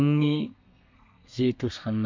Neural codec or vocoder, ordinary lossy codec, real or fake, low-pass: codec, 32 kHz, 1.9 kbps, SNAC; MP3, 64 kbps; fake; 7.2 kHz